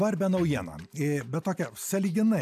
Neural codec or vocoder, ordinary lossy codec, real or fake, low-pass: none; AAC, 96 kbps; real; 14.4 kHz